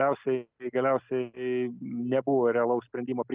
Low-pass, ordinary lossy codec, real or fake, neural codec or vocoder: 3.6 kHz; Opus, 24 kbps; real; none